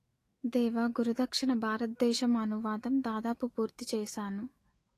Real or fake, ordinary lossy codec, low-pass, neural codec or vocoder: fake; AAC, 48 kbps; 14.4 kHz; autoencoder, 48 kHz, 128 numbers a frame, DAC-VAE, trained on Japanese speech